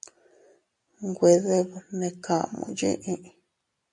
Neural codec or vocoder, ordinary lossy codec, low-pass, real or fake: none; MP3, 96 kbps; 10.8 kHz; real